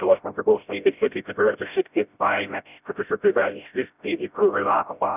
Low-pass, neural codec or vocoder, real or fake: 3.6 kHz; codec, 16 kHz, 0.5 kbps, FreqCodec, smaller model; fake